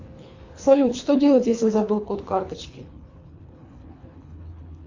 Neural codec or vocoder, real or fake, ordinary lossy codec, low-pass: codec, 24 kHz, 3 kbps, HILCodec; fake; AAC, 48 kbps; 7.2 kHz